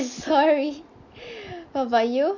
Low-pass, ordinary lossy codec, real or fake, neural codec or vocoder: 7.2 kHz; none; real; none